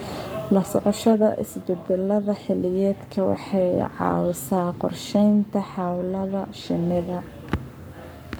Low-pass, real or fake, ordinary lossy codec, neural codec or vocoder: none; fake; none; codec, 44.1 kHz, 7.8 kbps, Pupu-Codec